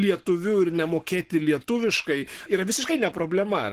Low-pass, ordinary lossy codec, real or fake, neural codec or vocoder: 14.4 kHz; Opus, 16 kbps; fake; codec, 44.1 kHz, 7.8 kbps, Pupu-Codec